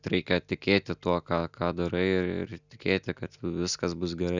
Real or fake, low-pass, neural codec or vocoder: real; 7.2 kHz; none